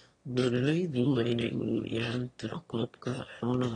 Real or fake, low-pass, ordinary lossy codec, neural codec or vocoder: fake; 9.9 kHz; AAC, 32 kbps; autoencoder, 22.05 kHz, a latent of 192 numbers a frame, VITS, trained on one speaker